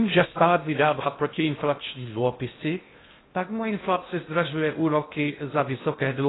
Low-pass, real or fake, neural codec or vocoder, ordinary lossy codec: 7.2 kHz; fake; codec, 16 kHz in and 24 kHz out, 0.6 kbps, FocalCodec, streaming, 4096 codes; AAC, 16 kbps